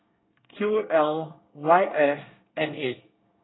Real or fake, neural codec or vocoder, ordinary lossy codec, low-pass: fake; codec, 24 kHz, 1 kbps, SNAC; AAC, 16 kbps; 7.2 kHz